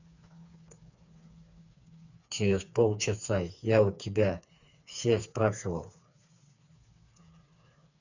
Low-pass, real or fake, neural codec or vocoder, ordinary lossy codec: 7.2 kHz; fake; codec, 16 kHz, 4 kbps, FreqCodec, smaller model; none